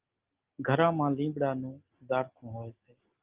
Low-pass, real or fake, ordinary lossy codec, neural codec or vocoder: 3.6 kHz; real; Opus, 32 kbps; none